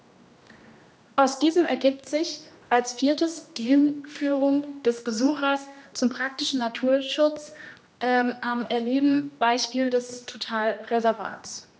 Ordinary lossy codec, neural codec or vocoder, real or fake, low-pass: none; codec, 16 kHz, 1 kbps, X-Codec, HuBERT features, trained on general audio; fake; none